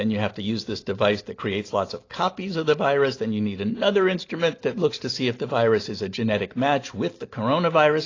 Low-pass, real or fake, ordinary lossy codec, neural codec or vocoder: 7.2 kHz; real; AAC, 32 kbps; none